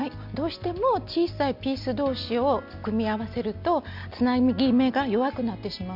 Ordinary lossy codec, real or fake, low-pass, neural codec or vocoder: none; real; 5.4 kHz; none